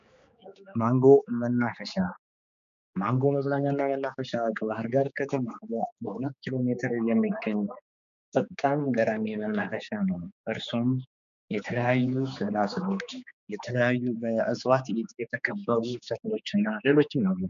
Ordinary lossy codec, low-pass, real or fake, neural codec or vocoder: MP3, 64 kbps; 7.2 kHz; fake; codec, 16 kHz, 4 kbps, X-Codec, HuBERT features, trained on general audio